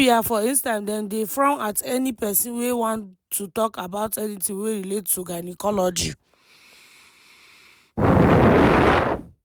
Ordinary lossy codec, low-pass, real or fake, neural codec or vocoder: none; none; real; none